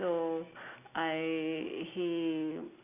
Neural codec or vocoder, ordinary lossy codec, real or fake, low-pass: none; none; real; 3.6 kHz